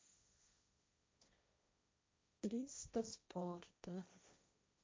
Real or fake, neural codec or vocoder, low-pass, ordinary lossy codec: fake; codec, 16 kHz, 1.1 kbps, Voila-Tokenizer; none; none